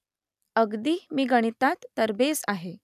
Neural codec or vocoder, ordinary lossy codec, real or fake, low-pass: none; none; real; 14.4 kHz